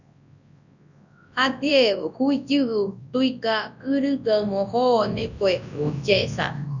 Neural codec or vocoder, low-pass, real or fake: codec, 24 kHz, 0.9 kbps, WavTokenizer, large speech release; 7.2 kHz; fake